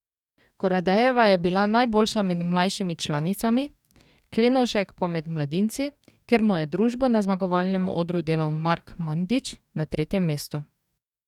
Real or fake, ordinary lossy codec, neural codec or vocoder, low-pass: fake; none; codec, 44.1 kHz, 2.6 kbps, DAC; 19.8 kHz